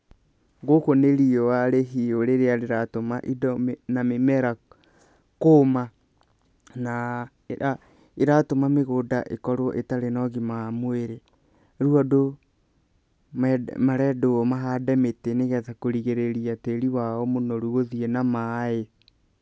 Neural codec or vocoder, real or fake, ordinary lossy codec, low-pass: none; real; none; none